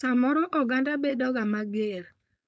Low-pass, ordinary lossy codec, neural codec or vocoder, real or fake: none; none; codec, 16 kHz, 4.8 kbps, FACodec; fake